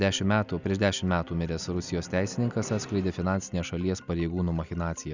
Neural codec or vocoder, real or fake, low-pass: none; real; 7.2 kHz